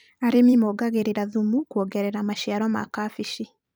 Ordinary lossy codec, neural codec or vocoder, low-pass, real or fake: none; vocoder, 44.1 kHz, 128 mel bands every 256 samples, BigVGAN v2; none; fake